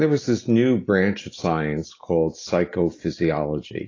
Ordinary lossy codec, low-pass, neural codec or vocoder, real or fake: AAC, 32 kbps; 7.2 kHz; none; real